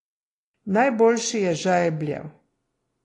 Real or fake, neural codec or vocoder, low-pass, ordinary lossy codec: real; none; 10.8 kHz; AAC, 32 kbps